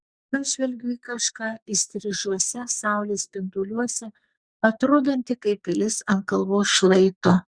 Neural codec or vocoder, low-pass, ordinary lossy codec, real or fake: codec, 44.1 kHz, 2.6 kbps, SNAC; 9.9 kHz; Opus, 64 kbps; fake